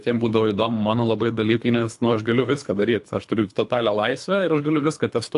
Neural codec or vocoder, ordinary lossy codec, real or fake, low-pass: codec, 24 kHz, 3 kbps, HILCodec; AAC, 64 kbps; fake; 10.8 kHz